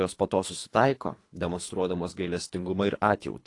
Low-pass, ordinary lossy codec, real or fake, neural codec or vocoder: 10.8 kHz; AAC, 48 kbps; fake; codec, 24 kHz, 3 kbps, HILCodec